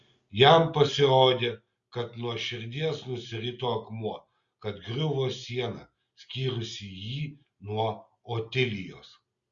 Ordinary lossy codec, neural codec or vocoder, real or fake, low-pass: Opus, 64 kbps; none; real; 7.2 kHz